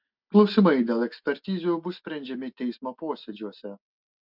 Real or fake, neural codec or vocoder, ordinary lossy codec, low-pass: real; none; MP3, 48 kbps; 5.4 kHz